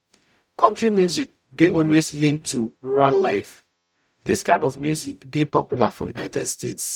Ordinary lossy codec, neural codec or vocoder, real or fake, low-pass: none; codec, 44.1 kHz, 0.9 kbps, DAC; fake; 19.8 kHz